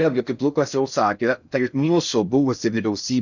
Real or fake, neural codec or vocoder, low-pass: fake; codec, 16 kHz in and 24 kHz out, 0.6 kbps, FocalCodec, streaming, 4096 codes; 7.2 kHz